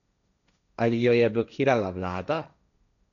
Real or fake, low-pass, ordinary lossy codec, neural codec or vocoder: fake; 7.2 kHz; none; codec, 16 kHz, 1.1 kbps, Voila-Tokenizer